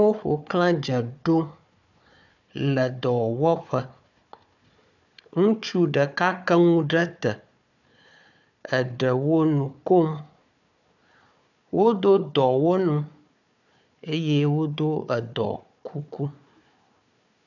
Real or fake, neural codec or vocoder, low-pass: fake; codec, 16 kHz, 4 kbps, FunCodec, trained on Chinese and English, 50 frames a second; 7.2 kHz